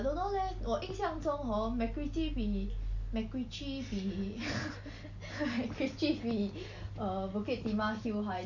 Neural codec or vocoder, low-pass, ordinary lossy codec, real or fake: none; 7.2 kHz; none; real